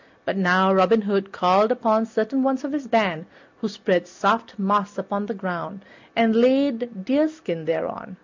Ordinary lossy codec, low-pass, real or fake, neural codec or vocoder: MP3, 64 kbps; 7.2 kHz; real; none